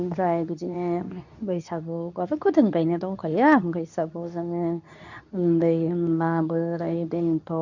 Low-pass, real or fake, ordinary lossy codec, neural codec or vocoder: 7.2 kHz; fake; none; codec, 24 kHz, 0.9 kbps, WavTokenizer, medium speech release version 2